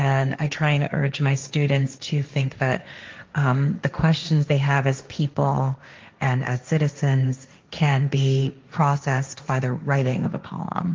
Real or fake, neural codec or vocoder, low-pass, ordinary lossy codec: fake; codec, 16 kHz, 1.1 kbps, Voila-Tokenizer; 7.2 kHz; Opus, 32 kbps